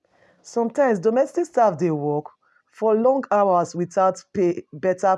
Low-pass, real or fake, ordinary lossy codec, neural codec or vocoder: none; real; none; none